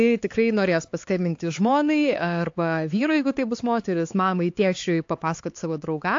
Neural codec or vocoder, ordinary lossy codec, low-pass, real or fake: codec, 16 kHz, 4 kbps, X-Codec, HuBERT features, trained on LibriSpeech; AAC, 48 kbps; 7.2 kHz; fake